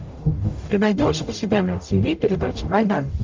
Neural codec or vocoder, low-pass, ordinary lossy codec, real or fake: codec, 44.1 kHz, 0.9 kbps, DAC; 7.2 kHz; Opus, 32 kbps; fake